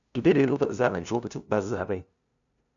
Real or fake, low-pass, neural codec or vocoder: fake; 7.2 kHz; codec, 16 kHz, 0.5 kbps, FunCodec, trained on LibriTTS, 25 frames a second